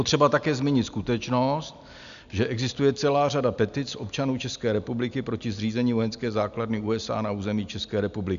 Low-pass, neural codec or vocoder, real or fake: 7.2 kHz; none; real